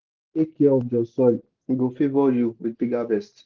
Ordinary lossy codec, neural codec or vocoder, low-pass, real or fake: Opus, 16 kbps; none; 7.2 kHz; real